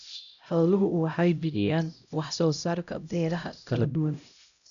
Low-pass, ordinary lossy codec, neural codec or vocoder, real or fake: 7.2 kHz; none; codec, 16 kHz, 0.5 kbps, X-Codec, HuBERT features, trained on LibriSpeech; fake